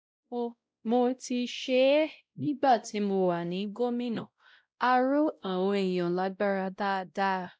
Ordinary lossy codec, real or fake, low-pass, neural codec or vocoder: none; fake; none; codec, 16 kHz, 0.5 kbps, X-Codec, WavLM features, trained on Multilingual LibriSpeech